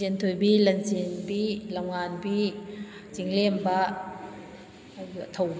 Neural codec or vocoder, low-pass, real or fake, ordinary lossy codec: none; none; real; none